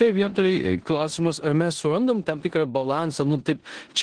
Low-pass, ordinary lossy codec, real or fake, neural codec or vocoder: 9.9 kHz; Opus, 16 kbps; fake; codec, 16 kHz in and 24 kHz out, 0.9 kbps, LongCat-Audio-Codec, four codebook decoder